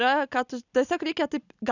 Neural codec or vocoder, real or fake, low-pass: none; real; 7.2 kHz